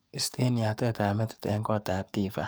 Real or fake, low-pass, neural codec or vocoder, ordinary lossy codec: fake; none; codec, 44.1 kHz, 7.8 kbps, DAC; none